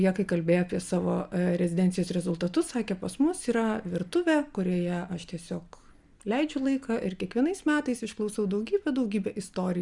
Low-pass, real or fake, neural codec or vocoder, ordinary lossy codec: 10.8 kHz; real; none; Opus, 64 kbps